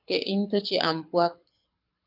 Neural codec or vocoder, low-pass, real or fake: codec, 24 kHz, 6 kbps, HILCodec; 5.4 kHz; fake